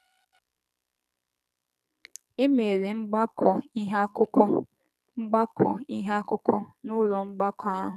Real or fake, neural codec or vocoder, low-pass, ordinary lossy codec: fake; codec, 44.1 kHz, 2.6 kbps, SNAC; 14.4 kHz; none